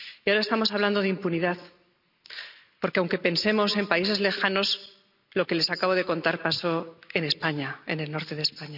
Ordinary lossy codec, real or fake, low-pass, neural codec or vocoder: none; real; 5.4 kHz; none